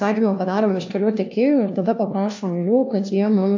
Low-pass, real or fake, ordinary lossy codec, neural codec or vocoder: 7.2 kHz; fake; AAC, 48 kbps; codec, 16 kHz, 1 kbps, FunCodec, trained on LibriTTS, 50 frames a second